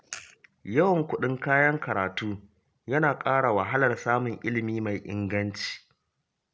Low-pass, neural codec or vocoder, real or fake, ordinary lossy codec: none; none; real; none